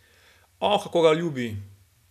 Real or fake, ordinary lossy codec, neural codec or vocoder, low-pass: real; none; none; 14.4 kHz